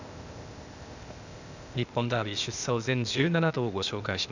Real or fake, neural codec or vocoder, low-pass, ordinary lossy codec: fake; codec, 16 kHz, 0.8 kbps, ZipCodec; 7.2 kHz; none